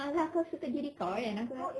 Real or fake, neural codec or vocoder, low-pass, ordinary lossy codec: real; none; none; none